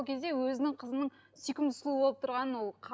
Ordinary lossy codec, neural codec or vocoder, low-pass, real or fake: none; none; none; real